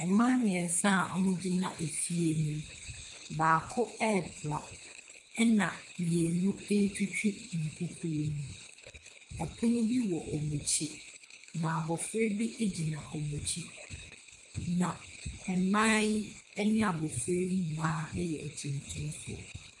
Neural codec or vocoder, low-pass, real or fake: codec, 24 kHz, 3 kbps, HILCodec; 10.8 kHz; fake